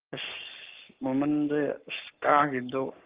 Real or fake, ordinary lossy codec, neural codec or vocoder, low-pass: fake; Opus, 32 kbps; codec, 44.1 kHz, 7.8 kbps, Pupu-Codec; 3.6 kHz